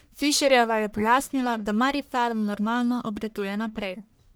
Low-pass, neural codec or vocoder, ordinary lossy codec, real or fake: none; codec, 44.1 kHz, 1.7 kbps, Pupu-Codec; none; fake